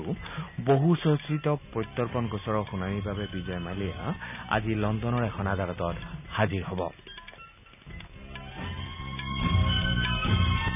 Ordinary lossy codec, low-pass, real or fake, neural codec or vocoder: none; 3.6 kHz; real; none